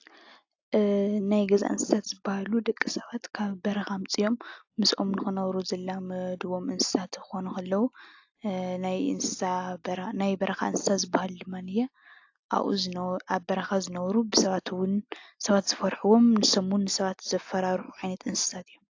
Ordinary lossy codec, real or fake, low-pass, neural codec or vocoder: AAC, 48 kbps; real; 7.2 kHz; none